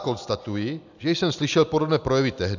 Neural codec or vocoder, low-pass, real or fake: none; 7.2 kHz; real